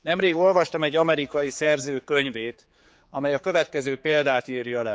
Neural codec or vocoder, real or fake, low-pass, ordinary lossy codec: codec, 16 kHz, 4 kbps, X-Codec, HuBERT features, trained on general audio; fake; none; none